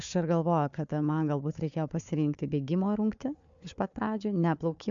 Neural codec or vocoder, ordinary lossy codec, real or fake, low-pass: codec, 16 kHz, 4 kbps, FunCodec, trained on Chinese and English, 50 frames a second; MP3, 64 kbps; fake; 7.2 kHz